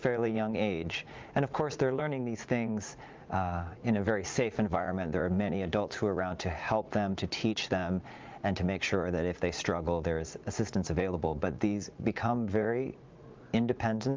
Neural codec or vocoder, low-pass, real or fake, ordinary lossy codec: vocoder, 44.1 kHz, 80 mel bands, Vocos; 7.2 kHz; fake; Opus, 24 kbps